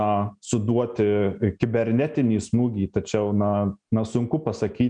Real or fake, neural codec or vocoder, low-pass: real; none; 9.9 kHz